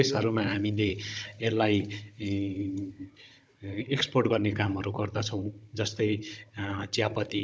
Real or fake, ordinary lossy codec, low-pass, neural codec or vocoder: fake; none; none; codec, 16 kHz, 16 kbps, FunCodec, trained on Chinese and English, 50 frames a second